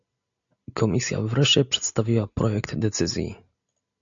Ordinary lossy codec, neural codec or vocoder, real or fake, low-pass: AAC, 64 kbps; none; real; 7.2 kHz